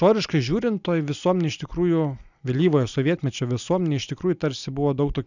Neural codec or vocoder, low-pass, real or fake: none; 7.2 kHz; real